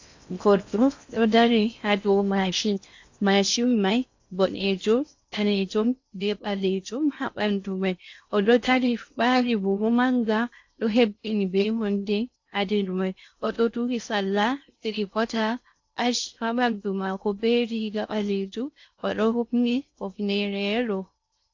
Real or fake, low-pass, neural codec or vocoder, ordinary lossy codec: fake; 7.2 kHz; codec, 16 kHz in and 24 kHz out, 0.6 kbps, FocalCodec, streaming, 4096 codes; AAC, 48 kbps